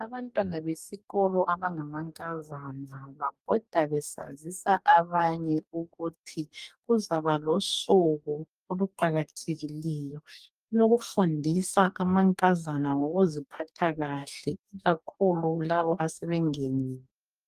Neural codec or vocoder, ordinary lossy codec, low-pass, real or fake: codec, 44.1 kHz, 2.6 kbps, DAC; Opus, 32 kbps; 14.4 kHz; fake